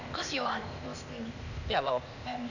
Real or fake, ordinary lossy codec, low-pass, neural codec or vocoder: fake; none; 7.2 kHz; codec, 16 kHz, 0.8 kbps, ZipCodec